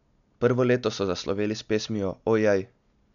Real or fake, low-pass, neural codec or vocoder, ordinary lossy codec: real; 7.2 kHz; none; none